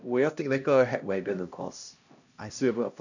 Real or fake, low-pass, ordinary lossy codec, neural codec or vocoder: fake; 7.2 kHz; none; codec, 16 kHz, 1 kbps, X-Codec, HuBERT features, trained on LibriSpeech